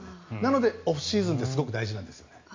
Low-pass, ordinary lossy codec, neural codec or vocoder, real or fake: 7.2 kHz; MP3, 48 kbps; none; real